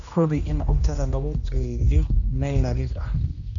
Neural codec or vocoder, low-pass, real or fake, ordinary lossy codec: codec, 16 kHz, 1 kbps, X-Codec, HuBERT features, trained on general audio; 7.2 kHz; fake; none